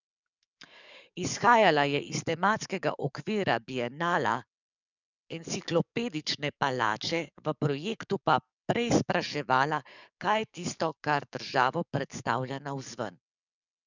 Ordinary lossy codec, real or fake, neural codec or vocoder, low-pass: none; fake; codec, 44.1 kHz, 7.8 kbps, DAC; 7.2 kHz